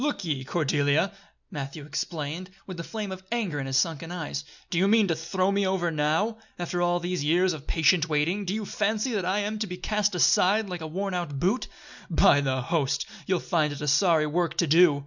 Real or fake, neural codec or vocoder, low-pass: real; none; 7.2 kHz